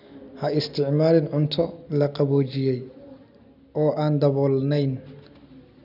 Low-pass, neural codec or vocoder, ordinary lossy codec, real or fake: 5.4 kHz; none; none; real